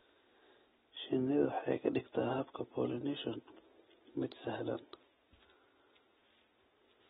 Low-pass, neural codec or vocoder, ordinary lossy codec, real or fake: 19.8 kHz; none; AAC, 16 kbps; real